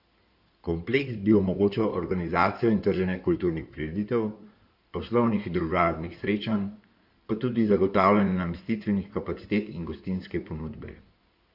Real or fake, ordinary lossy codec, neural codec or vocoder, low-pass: fake; none; codec, 16 kHz in and 24 kHz out, 2.2 kbps, FireRedTTS-2 codec; 5.4 kHz